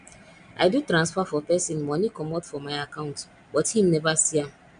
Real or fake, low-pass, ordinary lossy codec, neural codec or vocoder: real; 9.9 kHz; none; none